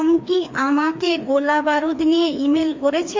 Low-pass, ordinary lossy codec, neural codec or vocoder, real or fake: 7.2 kHz; MP3, 48 kbps; codec, 16 kHz, 2 kbps, FreqCodec, larger model; fake